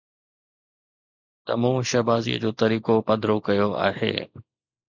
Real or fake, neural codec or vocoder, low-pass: real; none; 7.2 kHz